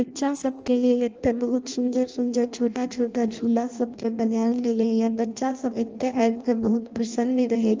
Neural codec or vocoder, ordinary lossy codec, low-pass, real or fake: codec, 16 kHz in and 24 kHz out, 0.6 kbps, FireRedTTS-2 codec; Opus, 24 kbps; 7.2 kHz; fake